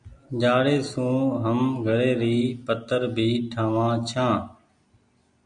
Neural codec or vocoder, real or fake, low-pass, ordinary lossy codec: none; real; 9.9 kHz; MP3, 64 kbps